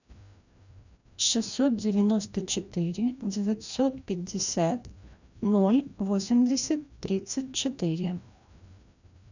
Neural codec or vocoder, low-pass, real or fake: codec, 16 kHz, 1 kbps, FreqCodec, larger model; 7.2 kHz; fake